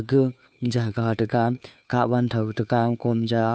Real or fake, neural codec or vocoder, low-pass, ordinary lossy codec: fake; codec, 16 kHz, 2 kbps, FunCodec, trained on Chinese and English, 25 frames a second; none; none